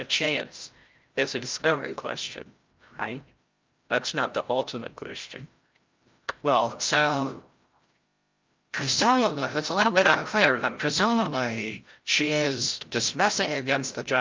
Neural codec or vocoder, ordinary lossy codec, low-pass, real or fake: codec, 16 kHz, 0.5 kbps, FreqCodec, larger model; Opus, 24 kbps; 7.2 kHz; fake